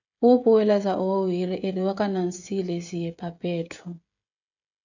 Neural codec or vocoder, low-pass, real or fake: codec, 16 kHz, 16 kbps, FreqCodec, smaller model; 7.2 kHz; fake